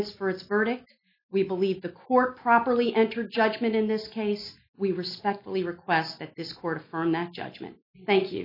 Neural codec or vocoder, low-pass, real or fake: none; 5.4 kHz; real